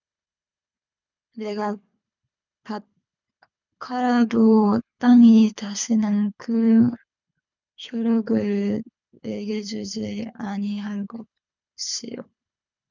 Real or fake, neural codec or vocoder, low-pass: fake; codec, 24 kHz, 3 kbps, HILCodec; 7.2 kHz